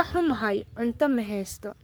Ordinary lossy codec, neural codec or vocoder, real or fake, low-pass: none; codec, 44.1 kHz, 3.4 kbps, Pupu-Codec; fake; none